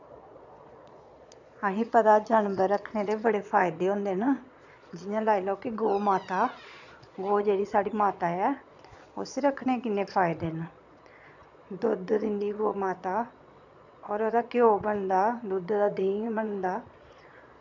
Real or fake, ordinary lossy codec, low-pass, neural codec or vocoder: fake; none; 7.2 kHz; vocoder, 22.05 kHz, 80 mel bands, Vocos